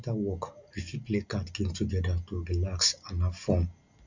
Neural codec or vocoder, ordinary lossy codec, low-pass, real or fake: none; Opus, 64 kbps; 7.2 kHz; real